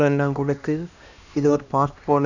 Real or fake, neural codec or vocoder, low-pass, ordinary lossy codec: fake; codec, 16 kHz, 1 kbps, X-Codec, HuBERT features, trained on LibriSpeech; 7.2 kHz; none